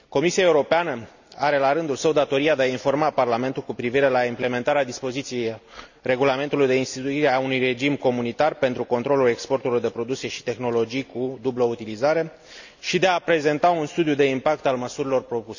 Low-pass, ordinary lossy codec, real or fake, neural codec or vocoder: 7.2 kHz; none; real; none